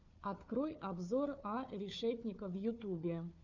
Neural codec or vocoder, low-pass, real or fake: codec, 16 kHz, 4 kbps, FunCodec, trained on Chinese and English, 50 frames a second; 7.2 kHz; fake